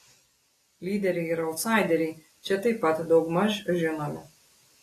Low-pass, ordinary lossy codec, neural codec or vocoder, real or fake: 14.4 kHz; AAC, 48 kbps; none; real